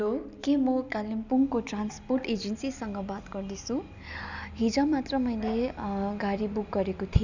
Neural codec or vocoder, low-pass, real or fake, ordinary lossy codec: none; 7.2 kHz; real; none